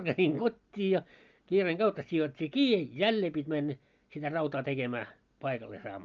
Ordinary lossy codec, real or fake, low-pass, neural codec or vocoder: Opus, 24 kbps; real; 7.2 kHz; none